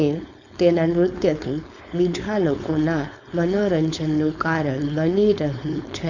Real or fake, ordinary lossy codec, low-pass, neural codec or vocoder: fake; none; 7.2 kHz; codec, 16 kHz, 4.8 kbps, FACodec